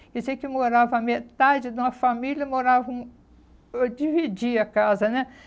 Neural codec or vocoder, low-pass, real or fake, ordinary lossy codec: none; none; real; none